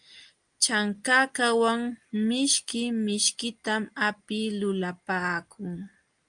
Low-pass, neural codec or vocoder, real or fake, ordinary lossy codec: 9.9 kHz; none; real; Opus, 32 kbps